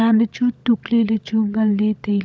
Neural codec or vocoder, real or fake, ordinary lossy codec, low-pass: codec, 16 kHz, 16 kbps, FunCodec, trained on LibriTTS, 50 frames a second; fake; none; none